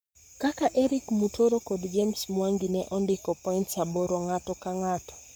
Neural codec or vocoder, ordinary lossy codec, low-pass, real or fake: codec, 44.1 kHz, 7.8 kbps, Pupu-Codec; none; none; fake